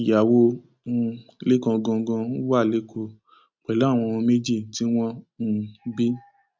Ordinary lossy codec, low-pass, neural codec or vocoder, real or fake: none; none; none; real